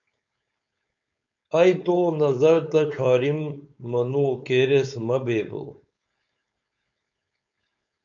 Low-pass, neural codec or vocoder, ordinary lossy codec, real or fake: 7.2 kHz; codec, 16 kHz, 4.8 kbps, FACodec; MP3, 96 kbps; fake